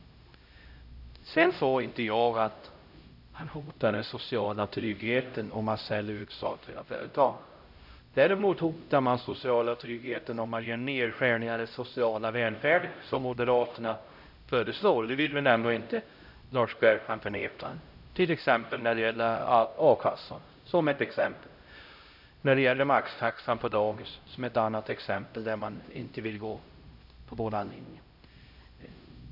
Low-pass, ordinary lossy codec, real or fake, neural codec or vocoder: 5.4 kHz; none; fake; codec, 16 kHz, 0.5 kbps, X-Codec, HuBERT features, trained on LibriSpeech